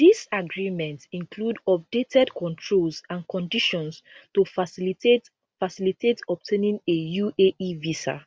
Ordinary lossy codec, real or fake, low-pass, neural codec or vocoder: none; real; none; none